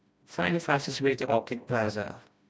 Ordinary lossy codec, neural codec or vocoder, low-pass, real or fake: none; codec, 16 kHz, 1 kbps, FreqCodec, smaller model; none; fake